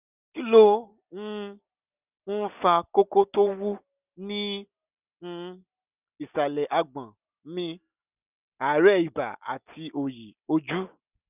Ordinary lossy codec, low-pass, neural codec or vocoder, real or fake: none; 3.6 kHz; none; real